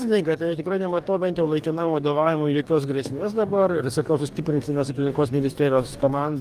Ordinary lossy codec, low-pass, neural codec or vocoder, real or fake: Opus, 32 kbps; 14.4 kHz; codec, 44.1 kHz, 2.6 kbps, DAC; fake